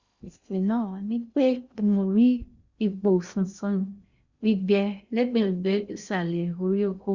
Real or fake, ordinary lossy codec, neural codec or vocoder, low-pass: fake; Opus, 64 kbps; codec, 16 kHz in and 24 kHz out, 0.6 kbps, FocalCodec, streaming, 2048 codes; 7.2 kHz